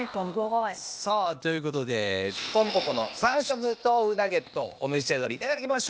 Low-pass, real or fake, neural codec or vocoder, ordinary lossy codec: none; fake; codec, 16 kHz, 0.8 kbps, ZipCodec; none